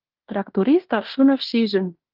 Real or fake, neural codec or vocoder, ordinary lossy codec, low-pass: fake; codec, 16 kHz in and 24 kHz out, 0.9 kbps, LongCat-Audio-Codec, fine tuned four codebook decoder; Opus, 32 kbps; 5.4 kHz